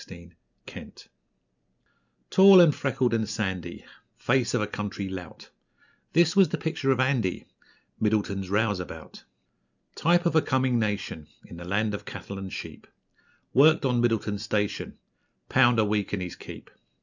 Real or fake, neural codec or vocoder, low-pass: real; none; 7.2 kHz